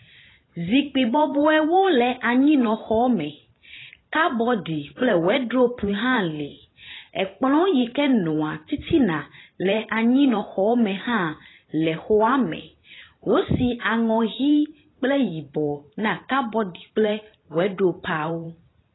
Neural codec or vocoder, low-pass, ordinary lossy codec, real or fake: vocoder, 44.1 kHz, 128 mel bands every 512 samples, BigVGAN v2; 7.2 kHz; AAC, 16 kbps; fake